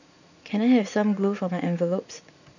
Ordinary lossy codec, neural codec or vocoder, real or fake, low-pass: none; vocoder, 44.1 kHz, 80 mel bands, Vocos; fake; 7.2 kHz